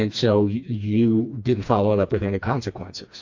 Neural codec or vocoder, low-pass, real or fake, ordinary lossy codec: codec, 16 kHz, 2 kbps, FreqCodec, smaller model; 7.2 kHz; fake; AAC, 48 kbps